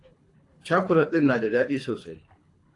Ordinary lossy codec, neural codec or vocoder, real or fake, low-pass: AAC, 48 kbps; codec, 24 kHz, 3 kbps, HILCodec; fake; 10.8 kHz